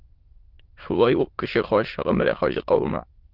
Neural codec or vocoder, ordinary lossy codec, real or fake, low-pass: autoencoder, 22.05 kHz, a latent of 192 numbers a frame, VITS, trained on many speakers; Opus, 16 kbps; fake; 5.4 kHz